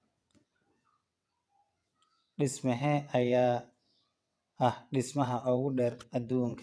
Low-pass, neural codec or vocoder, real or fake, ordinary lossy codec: none; vocoder, 22.05 kHz, 80 mel bands, WaveNeXt; fake; none